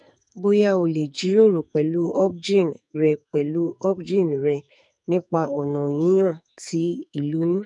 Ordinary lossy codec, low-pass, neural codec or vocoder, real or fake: none; 10.8 kHz; codec, 44.1 kHz, 2.6 kbps, SNAC; fake